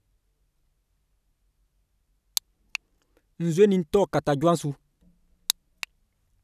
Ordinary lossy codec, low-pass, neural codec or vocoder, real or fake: none; 14.4 kHz; none; real